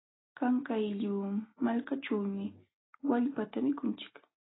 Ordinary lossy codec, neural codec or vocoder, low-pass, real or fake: AAC, 16 kbps; none; 7.2 kHz; real